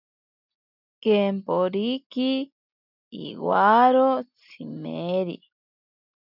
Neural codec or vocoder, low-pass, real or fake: none; 5.4 kHz; real